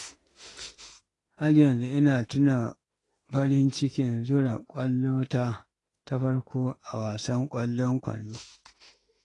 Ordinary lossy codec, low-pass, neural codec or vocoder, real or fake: AAC, 32 kbps; 10.8 kHz; autoencoder, 48 kHz, 32 numbers a frame, DAC-VAE, trained on Japanese speech; fake